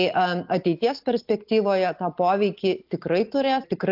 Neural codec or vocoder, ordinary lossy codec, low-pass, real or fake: none; AAC, 48 kbps; 5.4 kHz; real